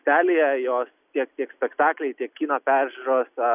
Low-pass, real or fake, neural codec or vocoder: 3.6 kHz; real; none